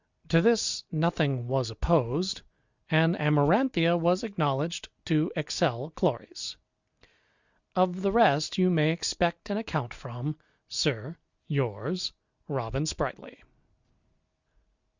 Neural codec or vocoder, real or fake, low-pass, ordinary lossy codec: none; real; 7.2 kHz; Opus, 64 kbps